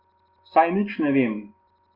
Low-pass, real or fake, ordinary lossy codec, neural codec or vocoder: 5.4 kHz; real; Opus, 32 kbps; none